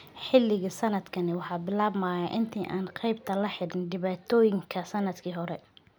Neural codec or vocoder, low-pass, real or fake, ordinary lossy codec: none; none; real; none